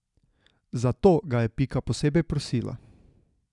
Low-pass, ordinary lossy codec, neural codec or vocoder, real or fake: 10.8 kHz; none; none; real